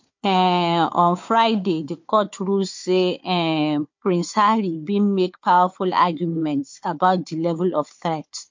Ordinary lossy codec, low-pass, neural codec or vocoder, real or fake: MP3, 48 kbps; 7.2 kHz; codec, 16 kHz, 4 kbps, FunCodec, trained on Chinese and English, 50 frames a second; fake